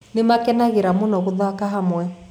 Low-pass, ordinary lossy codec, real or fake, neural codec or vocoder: 19.8 kHz; none; real; none